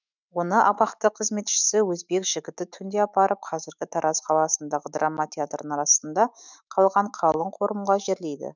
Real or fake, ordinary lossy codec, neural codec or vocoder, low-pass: fake; none; autoencoder, 48 kHz, 128 numbers a frame, DAC-VAE, trained on Japanese speech; 7.2 kHz